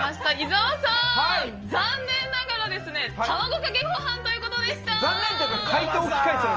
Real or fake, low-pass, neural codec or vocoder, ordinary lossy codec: real; 7.2 kHz; none; Opus, 24 kbps